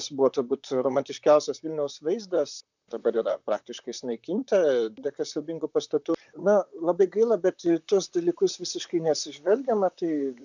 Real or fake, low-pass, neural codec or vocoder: fake; 7.2 kHz; vocoder, 44.1 kHz, 128 mel bands, Pupu-Vocoder